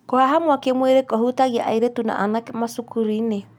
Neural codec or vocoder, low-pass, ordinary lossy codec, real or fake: none; 19.8 kHz; none; real